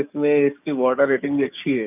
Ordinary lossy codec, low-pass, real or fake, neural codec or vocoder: none; 3.6 kHz; fake; codec, 44.1 kHz, 7.8 kbps, DAC